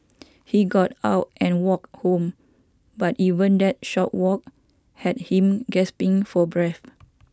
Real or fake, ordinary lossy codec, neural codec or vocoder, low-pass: real; none; none; none